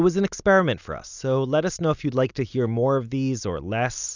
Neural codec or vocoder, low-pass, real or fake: none; 7.2 kHz; real